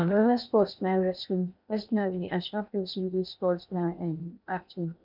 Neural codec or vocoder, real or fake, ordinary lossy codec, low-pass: codec, 16 kHz in and 24 kHz out, 0.8 kbps, FocalCodec, streaming, 65536 codes; fake; none; 5.4 kHz